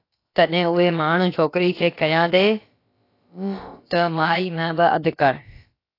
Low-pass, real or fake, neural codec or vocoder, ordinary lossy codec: 5.4 kHz; fake; codec, 16 kHz, about 1 kbps, DyCAST, with the encoder's durations; AAC, 32 kbps